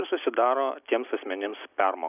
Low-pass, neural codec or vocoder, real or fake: 3.6 kHz; none; real